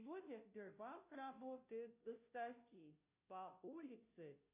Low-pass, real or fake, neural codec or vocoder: 3.6 kHz; fake; codec, 16 kHz, 0.5 kbps, FunCodec, trained on Chinese and English, 25 frames a second